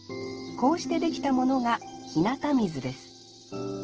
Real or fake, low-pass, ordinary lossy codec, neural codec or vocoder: real; 7.2 kHz; Opus, 16 kbps; none